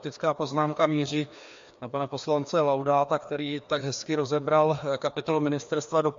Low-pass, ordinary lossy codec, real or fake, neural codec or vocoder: 7.2 kHz; MP3, 48 kbps; fake; codec, 16 kHz, 2 kbps, FreqCodec, larger model